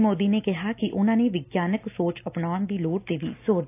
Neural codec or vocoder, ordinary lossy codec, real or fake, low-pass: none; AAC, 24 kbps; real; 3.6 kHz